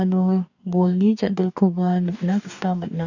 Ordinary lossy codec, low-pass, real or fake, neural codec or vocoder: none; 7.2 kHz; fake; codec, 44.1 kHz, 2.6 kbps, DAC